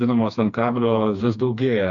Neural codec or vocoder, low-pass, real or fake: codec, 16 kHz, 2 kbps, FreqCodec, smaller model; 7.2 kHz; fake